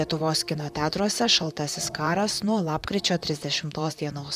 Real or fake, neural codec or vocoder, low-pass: fake; vocoder, 44.1 kHz, 128 mel bands, Pupu-Vocoder; 14.4 kHz